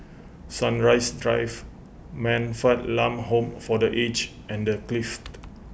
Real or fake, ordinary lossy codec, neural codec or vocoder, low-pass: real; none; none; none